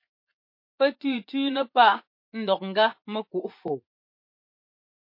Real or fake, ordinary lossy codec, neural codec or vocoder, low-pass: fake; MP3, 32 kbps; vocoder, 24 kHz, 100 mel bands, Vocos; 5.4 kHz